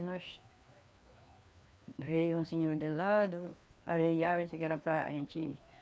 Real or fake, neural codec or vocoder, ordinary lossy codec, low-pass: fake; codec, 16 kHz, 2 kbps, FreqCodec, larger model; none; none